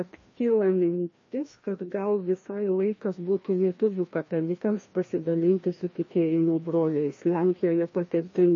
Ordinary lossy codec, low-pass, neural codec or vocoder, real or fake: MP3, 32 kbps; 7.2 kHz; codec, 16 kHz, 1 kbps, FreqCodec, larger model; fake